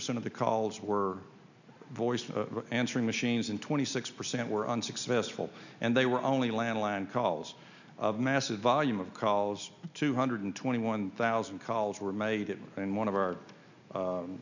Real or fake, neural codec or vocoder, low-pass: real; none; 7.2 kHz